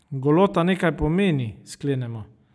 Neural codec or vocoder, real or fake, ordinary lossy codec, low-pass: none; real; none; none